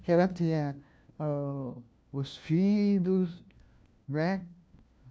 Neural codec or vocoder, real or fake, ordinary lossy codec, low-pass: codec, 16 kHz, 1 kbps, FunCodec, trained on LibriTTS, 50 frames a second; fake; none; none